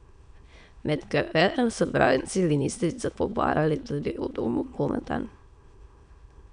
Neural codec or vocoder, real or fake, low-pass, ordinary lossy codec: autoencoder, 22.05 kHz, a latent of 192 numbers a frame, VITS, trained on many speakers; fake; 9.9 kHz; none